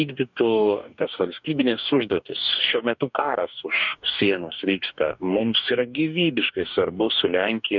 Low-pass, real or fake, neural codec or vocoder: 7.2 kHz; fake; codec, 44.1 kHz, 2.6 kbps, DAC